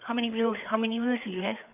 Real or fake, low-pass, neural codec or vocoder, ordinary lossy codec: fake; 3.6 kHz; codec, 16 kHz, 4 kbps, FreqCodec, larger model; none